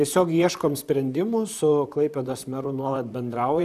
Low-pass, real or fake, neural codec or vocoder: 14.4 kHz; fake; vocoder, 44.1 kHz, 128 mel bands, Pupu-Vocoder